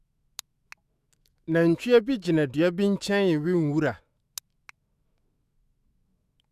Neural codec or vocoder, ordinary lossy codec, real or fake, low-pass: autoencoder, 48 kHz, 128 numbers a frame, DAC-VAE, trained on Japanese speech; Opus, 64 kbps; fake; 14.4 kHz